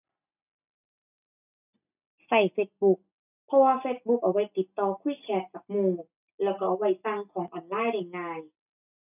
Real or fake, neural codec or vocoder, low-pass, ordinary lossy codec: real; none; 3.6 kHz; none